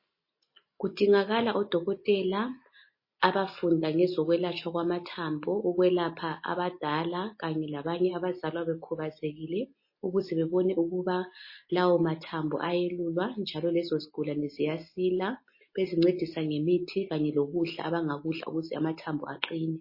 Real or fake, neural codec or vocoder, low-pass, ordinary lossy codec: real; none; 5.4 kHz; MP3, 24 kbps